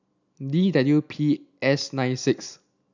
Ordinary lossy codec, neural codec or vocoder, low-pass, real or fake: none; none; 7.2 kHz; real